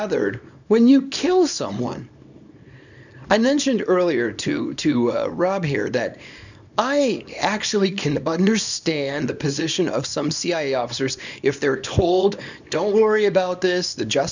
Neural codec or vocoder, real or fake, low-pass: codec, 24 kHz, 0.9 kbps, WavTokenizer, small release; fake; 7.2 kHz